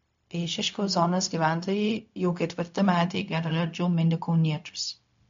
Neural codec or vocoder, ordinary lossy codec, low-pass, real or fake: codec, 16 kHz, 0.4 kbps, LongCat-Audio-Codec; MP3, 48 kbps; 7.2 kHz; fake